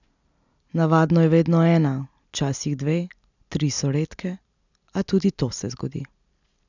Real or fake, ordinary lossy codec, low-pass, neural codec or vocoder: real; none; 7.2 kHz; none